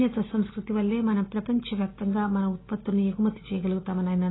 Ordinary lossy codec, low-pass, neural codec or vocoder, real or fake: AAC, 16 kbps; 7.2 kHz; none; real